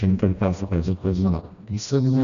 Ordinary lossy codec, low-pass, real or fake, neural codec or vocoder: MP3, 96 kbps; 7.2 kHz; fake; codec, 16 kHz, 1 kbps, FreqCodec, smaller model